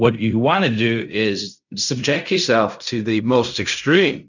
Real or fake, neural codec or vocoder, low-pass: fake; codec, 16 kHz in and 24 kHz out, 0.4 kbps, LongCat-Audio-Codec, fine tuned four codebook decoder; 7.2 kHz